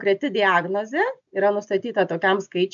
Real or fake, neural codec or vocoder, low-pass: real; none; 7.2 kHz